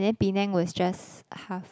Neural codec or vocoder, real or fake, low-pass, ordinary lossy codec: none; real; none; none